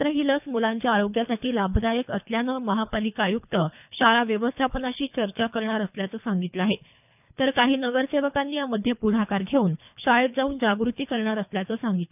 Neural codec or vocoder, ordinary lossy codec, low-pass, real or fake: codec, 24 kHz, 3 kbps, HILCodec; none; 3.6 kHz; fake